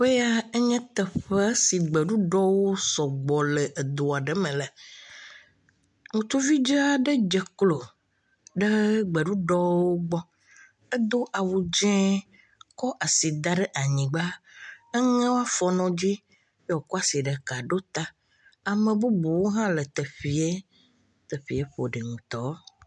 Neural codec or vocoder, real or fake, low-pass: none; real; 10.8 kHz